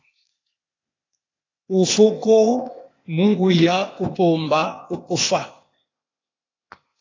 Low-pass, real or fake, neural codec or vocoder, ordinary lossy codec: 7.2 kHz; fake; codec, 16 kHz, 0.8 kbps, ZipCodec; AAC, 32 kbps